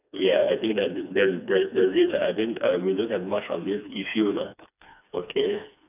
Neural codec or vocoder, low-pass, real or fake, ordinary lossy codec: codec, 16 kHz, 2 kbps, FreqCodec, smaller model; 3.6 kHz; fake; none